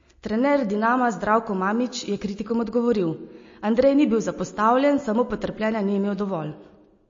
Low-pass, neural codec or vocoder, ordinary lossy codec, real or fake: 7.2 kHz; none; MP3, 32 kbps; real